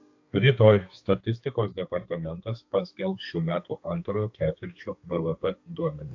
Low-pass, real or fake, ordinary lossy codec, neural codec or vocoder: 7.2 kHz; fake; AAC, 48 kbps; codec, 32 kHz, 1.9 kbps, SNAC